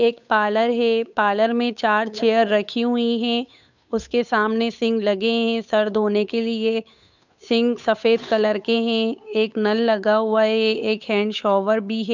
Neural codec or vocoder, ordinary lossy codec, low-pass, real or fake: codec, 16 kHz, 8 kbps, FunCodec, trained on Chinese and English, 25 frames a second; none; 7.2 kHz; fake